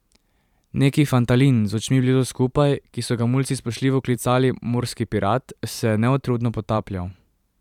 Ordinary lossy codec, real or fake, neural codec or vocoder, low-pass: none; fake; vocoder, 44.1 kHz, 128 mel bands every 512 samples, BigVGAN v2; 19.8 kHz